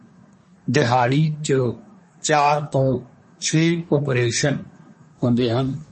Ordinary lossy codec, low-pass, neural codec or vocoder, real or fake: MP3, 32 kbps; 10.8 kHz; codec, 24 kHz, 1 kbps, SNAC; fake